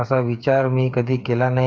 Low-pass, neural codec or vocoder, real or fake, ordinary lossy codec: none; codec, 16 kHz, 8 kbps, FreqCodec, smaller model; fake; none